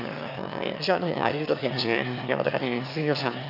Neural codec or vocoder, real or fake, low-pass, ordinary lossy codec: autoencoder, 22.05 kHz, a latent of 192 numbers a frame, VITS, trained on one speaker; fake; 5.4 kHz; none